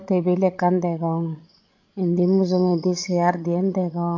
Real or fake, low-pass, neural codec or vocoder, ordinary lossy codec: fake; 7.2 kHz; codec, 16 kHz, 16 kbps, FreqCodec, larger model; MP3, 48 kbps